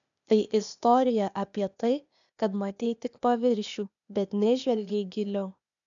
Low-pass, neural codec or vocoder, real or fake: 7.2 kHz; codec, 16 kHz, 0.8 kbps, ZipCodec; fake